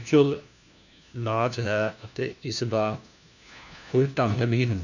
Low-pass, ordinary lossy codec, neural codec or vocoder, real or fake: 7.2 kHz; none; codec, 16 kHz, 1 kbps, FunCodec, trained on LibriTTS, 50 frames a second; fake